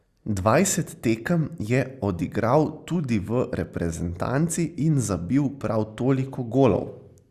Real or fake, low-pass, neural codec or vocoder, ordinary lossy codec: real; 14.4 kHz; none; Opus, 64 kbps